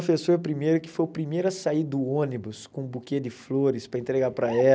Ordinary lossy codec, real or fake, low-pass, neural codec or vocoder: none; real; none; none